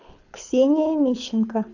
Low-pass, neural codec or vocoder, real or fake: 7.2 kHz; codec, 24 kHz, 6 kbps, HILCodec; fake